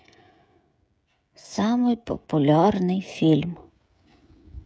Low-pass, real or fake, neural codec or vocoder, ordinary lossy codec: none; fake; codec, 16 kHz, 16 kbps, FreqCodec, smaller model; none